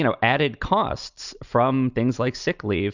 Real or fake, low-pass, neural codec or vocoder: real; 7.2 kHz; none